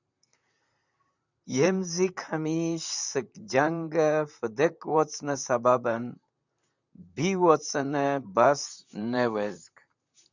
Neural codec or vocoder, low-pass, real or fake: vocoder, 44.1 kHz, 128 mel bands, Pupu-Vocoder; 7.2 kHz; fake